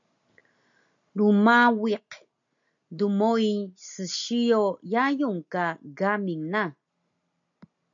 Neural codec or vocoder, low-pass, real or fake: none; 7.2 kHz; real